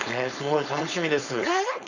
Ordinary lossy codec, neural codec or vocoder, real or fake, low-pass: none; codec, 16 kHz, 4.8 kbps, FACodec; fake; 7.2 kHz